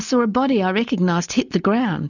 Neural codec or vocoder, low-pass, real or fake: none; 7.2 kHz; real